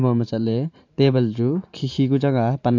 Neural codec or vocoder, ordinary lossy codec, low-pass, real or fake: vocoder, 44.1 kHz, 128 mel bands every 512 samples, BigVGAN v2; AAC, 48 kbps; 7.2 kHz; fake